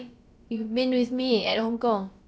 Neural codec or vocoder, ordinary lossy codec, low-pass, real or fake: codec, 16 kHz, about 1 kbps, DyCAST, with the encoder's durations; none; none; fake